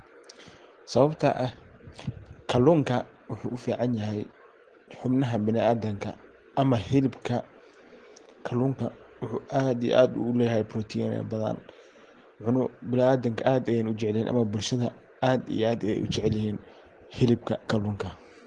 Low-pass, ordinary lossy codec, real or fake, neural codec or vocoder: 9.9 kHz; Opus, 16 kbps; real; none